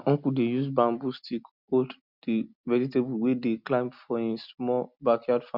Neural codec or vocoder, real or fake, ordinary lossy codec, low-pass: none; real; none; 5.4 kHz